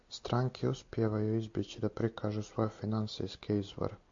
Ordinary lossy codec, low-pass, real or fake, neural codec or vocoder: AAC, 48 kbps; 7.2 kHz; real; none